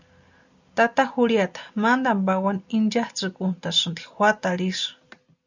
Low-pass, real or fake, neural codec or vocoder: 7.2 kHz; real; none